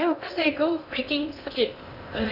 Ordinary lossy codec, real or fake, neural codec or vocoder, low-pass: none; fake; codec, 16 kHz in and 24 kHz out, 0.6 kbps, FocalCodec, streaming, 2048 codes; 5.4 kHz